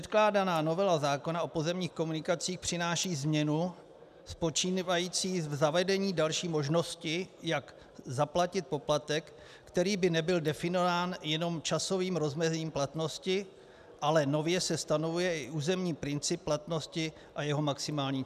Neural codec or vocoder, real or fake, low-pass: none; real; 14.4 kHz